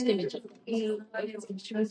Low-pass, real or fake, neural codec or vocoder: 9.9 kHz; fake; vocoder, 44.1 kHz, 128 mel bands every 512 samples, BigVGAN v2